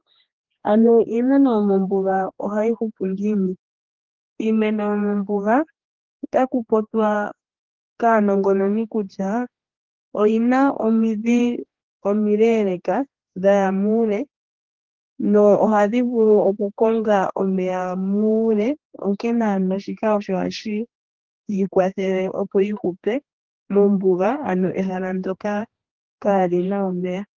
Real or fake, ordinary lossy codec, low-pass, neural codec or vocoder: fake; Opus, 16 kbps; 7.2 kHz; codec, 16 kHz, 2 kbps, X-Codec, HuBERT features, trained on general audio